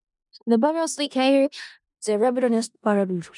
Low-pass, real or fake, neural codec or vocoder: 10.8 kHz; fake; codec, 16 kHz in and 24 kHz out, 0.4 kbps, LongCat-Audio-Codec, four codebook decoder